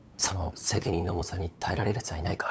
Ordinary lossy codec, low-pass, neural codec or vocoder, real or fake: none; none; codec, 16 kHz, 8 kbps, FunCodec, trained on LibriTTS, 25 frames a second; fake